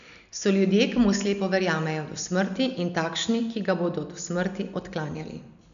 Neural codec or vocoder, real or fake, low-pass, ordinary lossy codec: none; real; 7.2 kHz; none